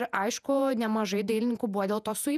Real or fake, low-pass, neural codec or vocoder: fake; 14.4 kHz; vocoder, 48 kHz, 128 mel bands, Vocos